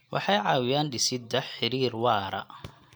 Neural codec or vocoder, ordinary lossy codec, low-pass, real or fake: none; none; none; real